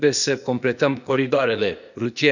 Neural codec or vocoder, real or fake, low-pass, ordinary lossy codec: codec, 16 kHz, 0.8 kbps, ZipCodec; fake; 7.2 kHz; none